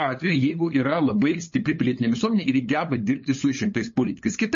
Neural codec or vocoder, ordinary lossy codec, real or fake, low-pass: codec, 16 kHz, 8 kbps, FunCodec, trained on LibriTTS, 25 frames a second; MP3, 32 kbps; fake; 7.2 kHz